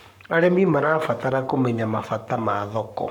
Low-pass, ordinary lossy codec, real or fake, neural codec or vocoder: 19.8 kHz; none; fake; codec, 44.1 kHz, 7.8 kbps, Pupu-Codec